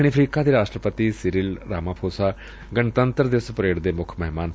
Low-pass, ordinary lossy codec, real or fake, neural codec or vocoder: none; none; real; none